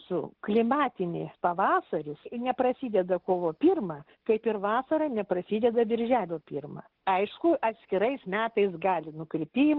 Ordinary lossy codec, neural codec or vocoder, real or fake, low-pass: Opus, 16 kbps; none; real; 5.4 kHz